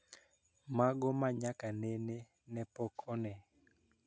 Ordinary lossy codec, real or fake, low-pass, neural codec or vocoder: none; real; none; none